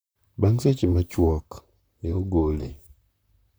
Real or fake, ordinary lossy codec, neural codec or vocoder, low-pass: fake; none; vocoder, 44.1 kHz, 128 mel bands, Pupu-Vocoder; none